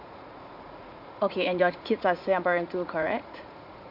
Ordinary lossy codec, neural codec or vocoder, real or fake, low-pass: none; vocoder, 44.1 kHz, 80 mel bands, Vocos; fake; 5.4 kHz